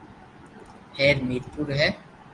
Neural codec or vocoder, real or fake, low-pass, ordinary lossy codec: none; real; 10.8 kHz; Opus, 24 kbps